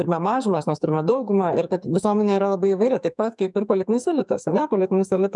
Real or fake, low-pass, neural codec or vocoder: fake; 10.8 kHz; codec, 44.1 kHz, 2.6 kbps, SNAC